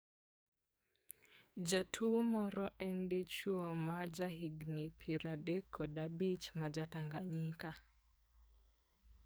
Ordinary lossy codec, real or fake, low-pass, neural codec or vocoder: none; fake; none; codec, 44.1 kHz, 2.6 kbps, SNAC